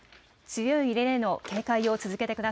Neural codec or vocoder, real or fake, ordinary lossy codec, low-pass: none; real; none; none